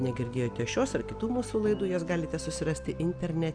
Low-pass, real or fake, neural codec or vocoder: 9.9 kHz; real; none